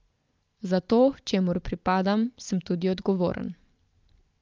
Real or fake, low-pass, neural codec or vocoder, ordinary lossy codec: real; 7.2 kHz; none; Opus, 24 kbps